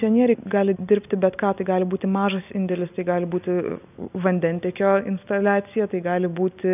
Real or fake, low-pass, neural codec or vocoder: real; 3.6 kHz; none